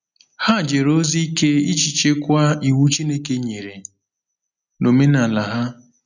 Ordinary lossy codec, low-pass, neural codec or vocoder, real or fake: none; 7.2 kHz; none; real